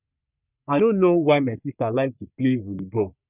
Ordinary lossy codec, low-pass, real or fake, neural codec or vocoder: none; 3.6 kHz; fake; codec, 44.1 kHz, 3.4 kbps, Pupu-Codec